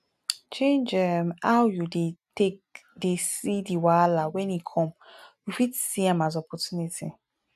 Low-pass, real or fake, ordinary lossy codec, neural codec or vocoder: 14.4 kHz; real; none; none